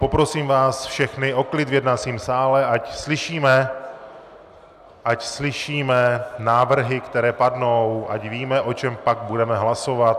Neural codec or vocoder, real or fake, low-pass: none; real; 14.4 kHz